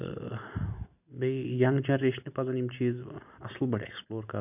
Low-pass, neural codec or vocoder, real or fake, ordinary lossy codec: 3.6 kHz; none; real; none